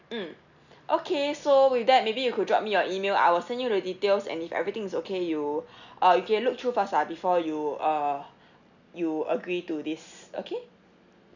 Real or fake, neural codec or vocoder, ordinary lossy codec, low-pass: real; none; none; 7.2 kHz